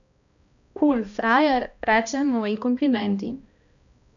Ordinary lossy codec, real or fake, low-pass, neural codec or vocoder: none; fake; 7.2 kHz; codec, 16 kHz, 1 kbps, X-Codec, HuBERT features, trained on balanced general audio